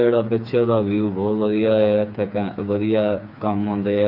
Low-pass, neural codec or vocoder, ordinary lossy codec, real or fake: 5.4 kHz; codec, 16 kHz, 4 kbps, FreqCodec, smaller model; none; fake